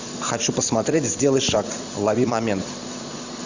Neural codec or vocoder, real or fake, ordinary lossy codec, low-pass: vocoder, 44.1 kHz, 128 mel bands every 256 samples, BigVGAN v2; fake; Opus, 64 kbps; 7.2 kHz